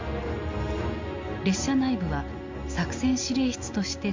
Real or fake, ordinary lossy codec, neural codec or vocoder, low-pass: real; MP3, 48 kbps; none; 7.2 kHz